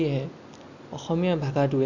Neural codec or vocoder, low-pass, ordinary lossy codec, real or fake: none; 7.2 kHz; none; real